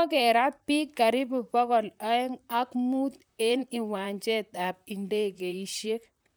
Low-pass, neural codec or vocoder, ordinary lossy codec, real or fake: none; vocoder, 44.1 kHz, 128 mel bands, Pupu-Vocoder; none; fake